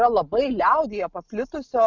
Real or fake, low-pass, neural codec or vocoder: real; 7.2 kHz; none